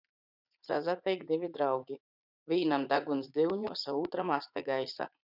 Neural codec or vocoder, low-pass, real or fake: vocoder, 44.1 kHz, 80 mel bands, Vocos; 5.4 kHz; fake